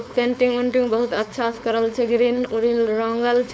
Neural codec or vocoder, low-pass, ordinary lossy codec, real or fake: codec, 16 kHz, 4.8 kbps, FACodec; none; none; fake